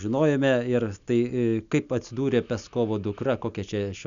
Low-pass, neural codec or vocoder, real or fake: 7.2 kHz; none; real